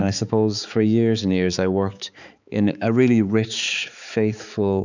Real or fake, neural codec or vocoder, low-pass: fake; codec, 16 kHz, 4 kbps, X-Codec, HuBERT features, trained on balanced general audio; 7.2 kHz